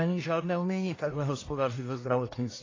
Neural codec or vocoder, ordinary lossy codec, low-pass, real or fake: codec, 44.1 kHz, 1.7 kbps, Pupu-Codec; AAC, 32 kbps; 7.2 kHz; fake